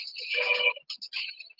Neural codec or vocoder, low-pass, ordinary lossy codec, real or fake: none; 5.4 kHz; Opus, 32 kbps; real